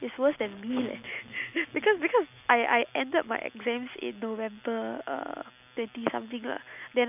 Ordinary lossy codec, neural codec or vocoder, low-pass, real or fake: none; none; 3.6 kHz; real